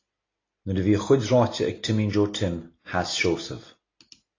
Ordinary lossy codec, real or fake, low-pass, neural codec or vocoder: AAC, 32 kbps; real; 7.2 kHz; none